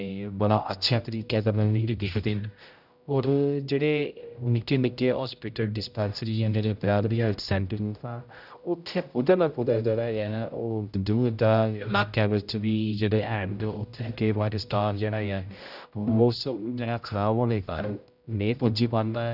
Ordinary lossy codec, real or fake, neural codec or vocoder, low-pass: none; fake; codec, 16 kHz, 0.5 kbps, X-Codec, HuBERT features, trained on general audio; 5.4 kHz